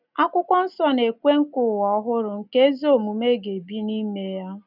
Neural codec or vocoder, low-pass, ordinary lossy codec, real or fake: none; 5.4 kHz; none; real